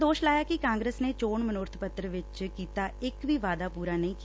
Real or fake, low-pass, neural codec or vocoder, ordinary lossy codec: real; none; none; none